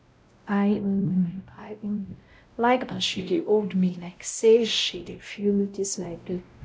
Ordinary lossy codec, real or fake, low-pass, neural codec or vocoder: none; fake; none; codec, 16 kHz, 0.5 kbps, X-Codec, WavLM features, trained on Multilingual LibriSpeech